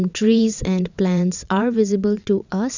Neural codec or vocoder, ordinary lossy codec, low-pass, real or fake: vocoder, 22.05 kHz, 80 mel bands, WaveNeXt; none; 7.2 kHz; fake